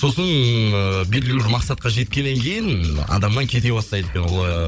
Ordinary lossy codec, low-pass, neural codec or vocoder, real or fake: none; none; codec, 16 kHz, 16 kbps, FunCodec, trained on Chinese and English, 50 frames a second; fake